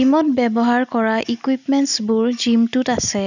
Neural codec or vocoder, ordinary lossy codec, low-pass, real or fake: none; none; 7.2 kHz; real